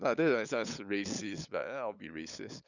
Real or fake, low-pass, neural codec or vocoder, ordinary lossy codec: fake; 7.2 kHz; codec, 16 kHz, 8 kbps, FunCodec, trained on LibriTTS, 25 frames a second; none